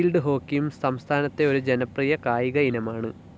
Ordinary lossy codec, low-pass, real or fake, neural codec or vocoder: none; none; real; none